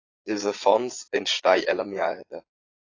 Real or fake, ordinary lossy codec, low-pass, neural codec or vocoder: fake; AAC, 32 kbps; 7.2 kHz; vocoder, 22.05 kHz, 80 mel bands, Vocos